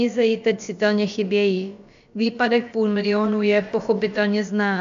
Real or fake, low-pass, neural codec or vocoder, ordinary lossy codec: fake; 7.2 kHz; codec, 16 kHz, about 1 kbps, DyCAST, with the encoder's durations; AAC, 64 kbps